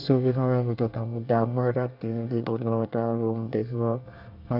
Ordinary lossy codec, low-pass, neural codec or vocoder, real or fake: none; 5.4 kHz; codec, 24 kHz, 1 kbps, SNAC; fake